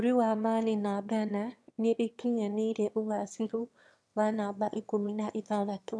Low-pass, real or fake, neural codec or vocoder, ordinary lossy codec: none; fake; autoencoder, 22.05 kHz, a latent of 192 numbers a frame, VITS, trained on one speaker; none